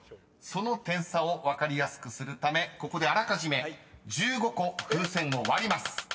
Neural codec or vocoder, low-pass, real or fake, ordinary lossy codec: none; none; real; none